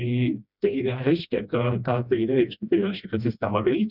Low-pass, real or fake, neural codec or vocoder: 5.4 kHz; fake; codec, 16 kHz, 1 kbps, FreqCodec, smaller model